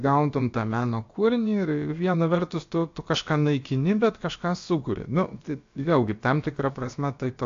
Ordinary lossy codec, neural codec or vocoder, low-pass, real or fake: AAC, 48 kbps; codec, 16 kHz, about 1 kbps, DyCAST, with the encoder's durations; 7.2 kHz; fake